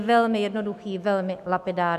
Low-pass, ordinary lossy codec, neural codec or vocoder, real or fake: 14.4 kHz; MP3, 96 kbps; autoencoder, 48 kHz, 128 numbers a frame, DAC-VAE, trained on Japanese speech; fake